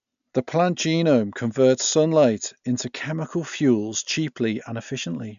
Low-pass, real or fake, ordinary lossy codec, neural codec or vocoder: 7.2 kHz; real; none; none